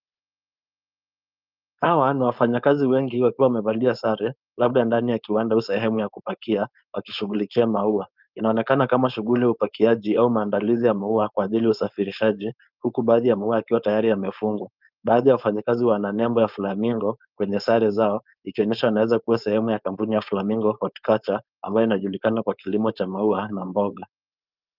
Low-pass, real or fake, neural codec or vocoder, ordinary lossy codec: 5.4 kHz; fake; codec, 16 kHz, 4.8 kbps, FACodec; Opus, 24 kbps